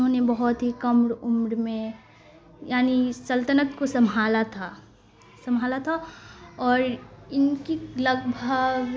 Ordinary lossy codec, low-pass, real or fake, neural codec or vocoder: none; none; real; none